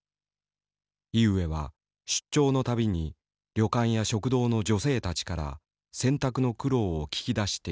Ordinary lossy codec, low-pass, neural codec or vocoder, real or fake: none; none; none; real